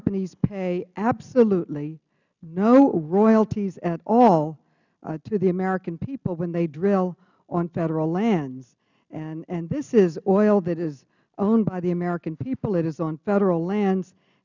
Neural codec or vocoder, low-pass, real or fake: none; 7.2 kHz; real